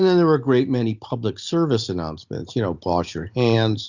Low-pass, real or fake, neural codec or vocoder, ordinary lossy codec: 7.2 kHz; real; none; Opus, 64 kbps